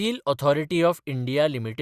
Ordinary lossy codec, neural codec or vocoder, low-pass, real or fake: Opus, 64 kbps; none; 14.4 kHz; real